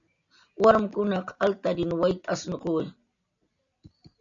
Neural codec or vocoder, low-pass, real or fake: none; 7.2 kHz; real